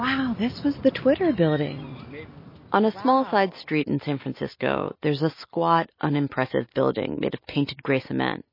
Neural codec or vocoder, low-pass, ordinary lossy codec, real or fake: none; 5.4 kHz; MP3, 24 kbps; real